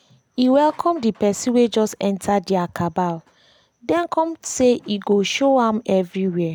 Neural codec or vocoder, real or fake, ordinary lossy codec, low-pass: none; real; none; 19.8 kHz